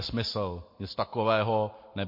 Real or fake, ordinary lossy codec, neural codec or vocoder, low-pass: real; MP3, 32 kbps; none; 5.4 kHz